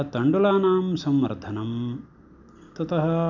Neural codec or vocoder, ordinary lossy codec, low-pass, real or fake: none; none; 7.2 kHz; real